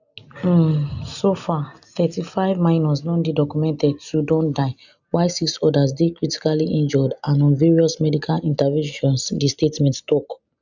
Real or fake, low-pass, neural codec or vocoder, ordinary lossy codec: real; 7.2 kHz; none; none